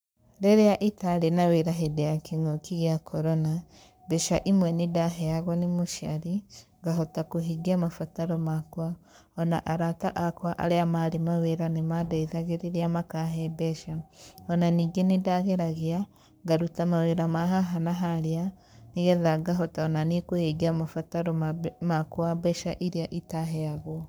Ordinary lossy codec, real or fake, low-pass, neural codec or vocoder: none; fake; none; codec, 44.1 kHz, 7.8 kbps, Pupu-Codec